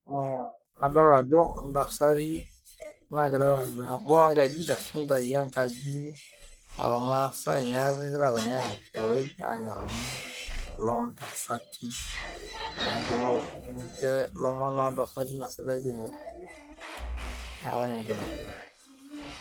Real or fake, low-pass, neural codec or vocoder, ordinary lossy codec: fake; none; codec, 44.1 kHz, 1.7 kbps, Pupu-Codec; none